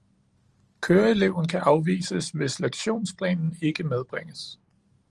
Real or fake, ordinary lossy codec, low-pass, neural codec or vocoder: fake; Opus, 24 kbps; 10.8 kHz; vocoder, 44.1 kHz, 128 mel bands every 512 samples, BigVGAN v2